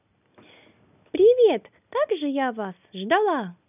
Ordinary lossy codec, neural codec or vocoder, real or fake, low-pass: none; none; real; 3.6 kHz